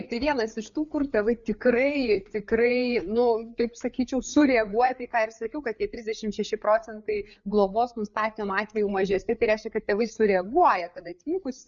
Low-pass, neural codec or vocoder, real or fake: 7.2 kHz; codec, 16 kHz, 4 kbps, FreqCodec, larger model; fake